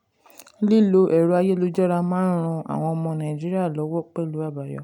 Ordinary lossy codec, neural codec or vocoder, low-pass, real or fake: none; none; 19.8 kHz; real